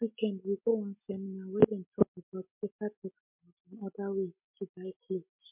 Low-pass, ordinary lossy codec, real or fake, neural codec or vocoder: 3.6 kHz; MP3, 32 kbps; real; none